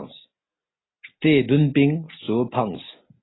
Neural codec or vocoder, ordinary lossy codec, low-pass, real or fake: none; AAC, 16 kbps; 7.2 kHz; real